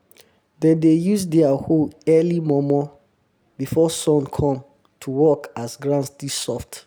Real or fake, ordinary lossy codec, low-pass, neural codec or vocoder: real; none; none; none